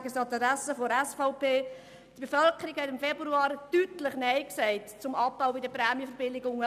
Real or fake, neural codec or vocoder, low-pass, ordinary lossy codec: real; none; 14.4 kHz; none